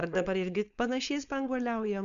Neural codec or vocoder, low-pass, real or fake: codec, 16 kHz, 4.8 kbps, FACodec; 7.2 kHz; fake